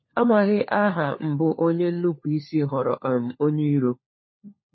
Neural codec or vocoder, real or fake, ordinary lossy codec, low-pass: codec, 16 kHz, 4 kbps, FunCodec, trained on LibriTTS, 50 frames a second; fake; MP3, 24 kbps; 7.2 kHz